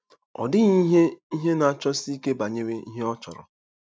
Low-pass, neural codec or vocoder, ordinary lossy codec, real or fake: none; none; none; real